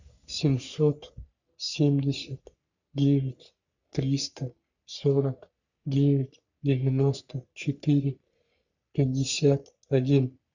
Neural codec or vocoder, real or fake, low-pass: codec, 44.1 kHz, 3.4 kbps, Pupu-Codec; fake; 7.2 kHz